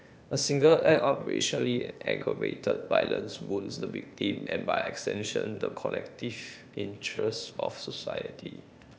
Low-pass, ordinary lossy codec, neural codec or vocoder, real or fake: none; none; codec, 16 kHz, 0.8 kbps, ZipCodec; fake